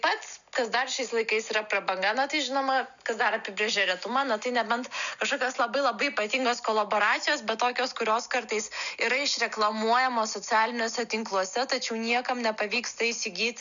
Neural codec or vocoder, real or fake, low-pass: none; real; 7.2 kHz